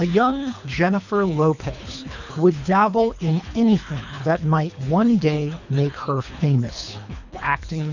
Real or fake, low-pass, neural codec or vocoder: fake; 7.2 kHz; codec, 24 kHz, 3 kbps, HILCodec